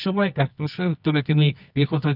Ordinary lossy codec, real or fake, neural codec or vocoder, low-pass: none; fake; codec, 24 kHz, 0.9 kbps, WavTokenizer, medium music audio release; 5.4 kHz